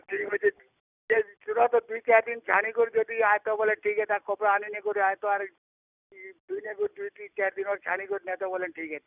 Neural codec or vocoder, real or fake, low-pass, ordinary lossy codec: none; real; 3.6 kHz; none